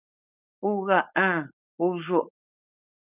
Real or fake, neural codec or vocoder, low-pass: fake; codec, 16 kHz, 4.8 kbps, FACodec; 3.6 kHz